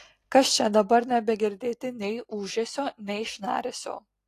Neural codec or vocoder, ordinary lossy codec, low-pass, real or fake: none; AAC, 48 kbps; 14.4 kHz; real